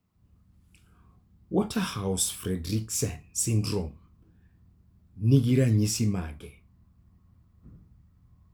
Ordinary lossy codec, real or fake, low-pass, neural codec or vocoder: none; real; none; none